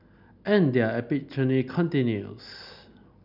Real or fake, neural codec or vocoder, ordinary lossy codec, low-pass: real; none; none; 5.4 kHz